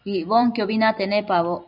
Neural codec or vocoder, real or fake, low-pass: vocoder, 44.1 kHz, 128 mel bands every 256 samples, BigVGAN v2; fake; 5.4 kHz